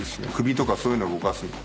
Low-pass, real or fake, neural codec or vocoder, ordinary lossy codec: none; real; none; none